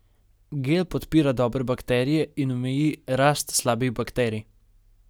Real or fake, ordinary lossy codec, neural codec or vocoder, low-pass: real; none; none; none